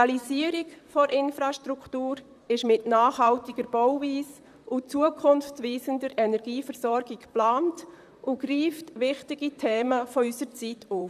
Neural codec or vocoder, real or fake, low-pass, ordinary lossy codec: vocoder, 44.1 kHz, 128 mel bands, Pupu-Vocoder; fake; 14.4 kHz; none